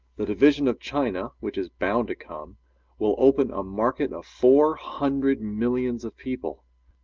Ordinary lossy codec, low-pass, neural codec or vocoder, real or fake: Opus, 32 kbps; 7.2 kHz; none; real